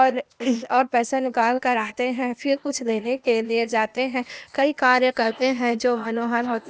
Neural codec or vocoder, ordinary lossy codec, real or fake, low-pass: codec, 16 kHz, 0.8 kbps, ZipCodec; none; fake; none